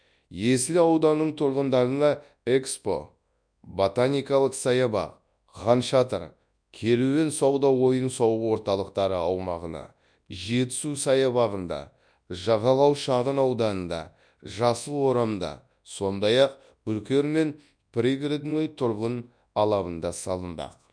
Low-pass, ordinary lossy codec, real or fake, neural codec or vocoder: 9.9 kHz; none; fake; codec, 24 kHz, 0.9 kbps, WavTokenizer, large speech release